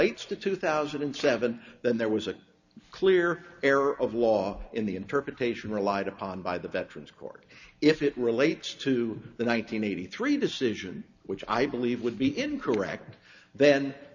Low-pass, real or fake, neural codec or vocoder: 7.2 kHz; real; none